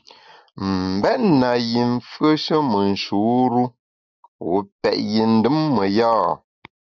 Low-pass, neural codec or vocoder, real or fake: 7.2 kHz; none; real